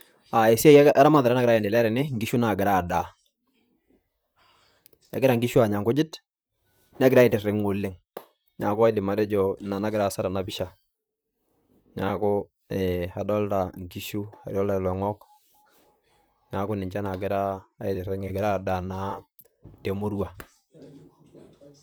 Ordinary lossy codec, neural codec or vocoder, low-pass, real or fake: none; vocoder, 44.1 kHz, 128 mel bands, Pupu-Vocoder; none; fake